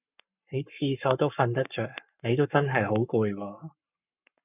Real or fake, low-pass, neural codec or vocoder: fake; 3.6 kHz; codec, 44.1 kHz, 7.8 kbps, Pupu-Codec